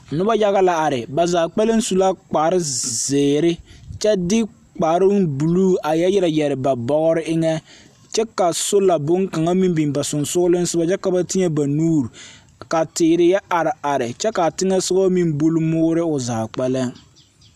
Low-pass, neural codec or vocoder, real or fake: 14.4 kHz; none; real